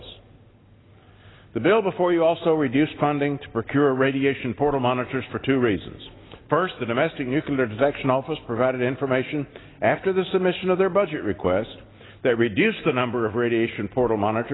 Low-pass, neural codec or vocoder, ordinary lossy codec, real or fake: 7.2 kHz; none; AAC, 16 kbps; real